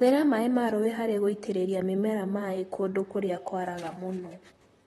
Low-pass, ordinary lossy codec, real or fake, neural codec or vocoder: 19.8 kHz; AAC, 32 kbps; fake; vocoder, 44.1 kHz, 128 mel bands, Pupu-Vocoder